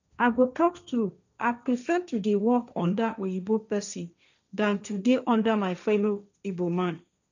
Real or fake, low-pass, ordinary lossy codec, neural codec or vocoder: fake; 7.2 kHz; none; codec, 16 kHz, 1.1 kbps, Voila-Tokenizer